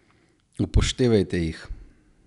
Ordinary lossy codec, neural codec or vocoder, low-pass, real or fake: none; none; 10.8 kHz; real